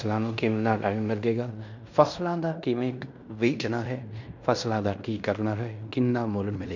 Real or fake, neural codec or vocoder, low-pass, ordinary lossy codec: fake; codec, 16 kHz in and 24 kHz out, 0.9 kbps, LongCat-Audio-Codec, fine tuned four codebook decoder; 7.2 kHz; none